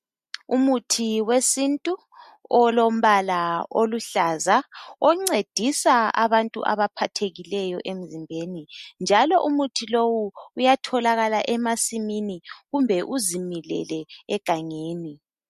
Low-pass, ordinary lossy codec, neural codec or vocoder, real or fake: 14.4 kHz; MP3, 64 kbps; none; real